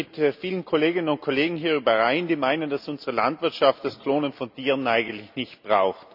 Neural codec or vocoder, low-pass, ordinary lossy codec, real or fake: none; 5.4 kHz; none; real